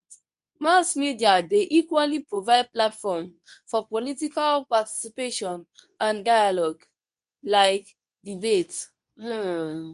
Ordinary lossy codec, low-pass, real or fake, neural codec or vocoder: MP3, 96 kbps; 10.8 kHz; fake; codec, 24 kHz, 0.9 kbps, WavTokenizer, medium speech release version 2